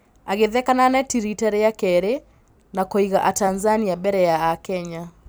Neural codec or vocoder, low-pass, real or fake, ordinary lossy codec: vocoder, 44.1 kHz, 128 mel bands every 256 samples, BigVGAN v2; none; fake; none